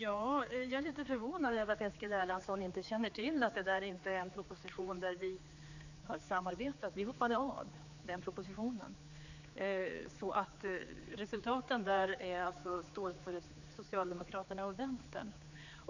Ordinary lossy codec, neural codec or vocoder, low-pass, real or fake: none; codec, 16 kHz, 4 kbps, X-Codec, HuBERT features, trained on general audio; 7.2 kHz; fake